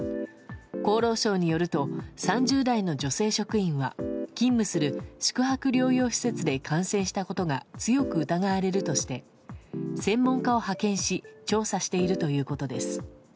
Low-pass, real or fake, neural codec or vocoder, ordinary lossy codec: none; real; none; none